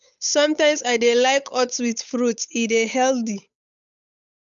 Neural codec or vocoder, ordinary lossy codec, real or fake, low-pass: codec, 16 kHz, 8 kbps, FunCodec, trained on Chinese and English, 25 frames a second; none; fake; 7.2 kHz